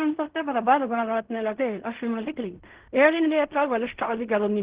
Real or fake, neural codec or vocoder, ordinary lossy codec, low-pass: fake; codec, 16 kHz in and 24 kHz out, 0.4 kbps, LongCat-Audio-Codec, fine tuned four codebook decoder; Opus, 16 kbps; 3.6 kHz